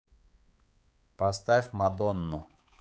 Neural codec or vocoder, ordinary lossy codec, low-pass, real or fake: codec, 16 kHz, 4 kbps, X-Codec, HuBERT features, trained on balanced general audio; none; none; fake